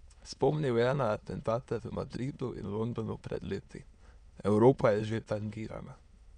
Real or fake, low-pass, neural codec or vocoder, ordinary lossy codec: fake; 9.9 kHz; autoencoder, 22.05 kHz, a latent of 192 numbers a frame, VITS, trained on many speakers; none